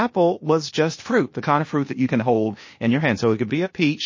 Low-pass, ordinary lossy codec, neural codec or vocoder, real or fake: 7.2 kHz; MP3, 32 kbps; codec, 16 kHz, 0.8 kbps, ZipCodec; fake